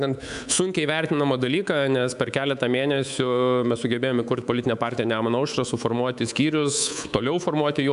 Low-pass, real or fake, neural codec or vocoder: 10.8 kHz; fake; codec, 24 kHz, 3.1 kbps, DualCodec